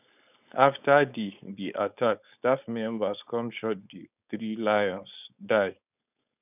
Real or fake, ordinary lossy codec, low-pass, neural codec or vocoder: fake; none; 3.6 kHz; codec, 16 kHz, 4.8 kbps, FACodec